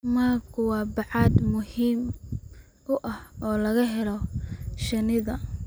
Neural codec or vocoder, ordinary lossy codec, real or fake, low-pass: none; none; real; none